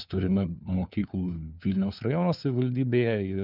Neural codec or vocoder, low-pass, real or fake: codec, 16 kHz, 4 kbps, FunCodec, trained on LibriTTS, 50 frames a second; 5.4 kHz; fake